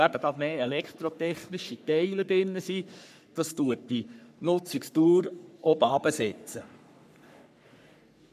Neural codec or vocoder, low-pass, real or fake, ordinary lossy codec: codec, 44.1 kHz, 3.4 kbps, Pupu-Codec; 14.4 kHz; fake; none